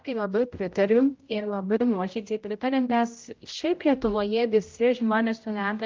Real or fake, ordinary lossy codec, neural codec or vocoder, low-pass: fake; Opus, 32 kbps; codec, 16 kHz, 0.5 kbps, X-Codec, HuBERT features, trained on general audio; 7.2 kHz